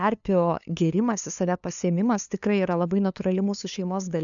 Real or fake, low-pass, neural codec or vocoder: fake; 7.2 kHz; codec, 16 kHz, 2 kbps, FunCodec, trained on LibriTTS, 25 frames a second